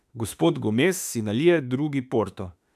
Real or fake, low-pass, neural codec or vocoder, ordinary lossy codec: fake; 14.4 kHz; autoencoder, 48 kHz, 32 numbers a frame, DAC-VAE, trained on Japanese speech; none